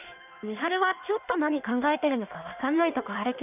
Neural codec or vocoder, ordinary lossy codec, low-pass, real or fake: codec, 16 kHz in and 24 kHz out, 1.1 kbps, FireRedTTS-2 codec; none; 3.6 kHz; fake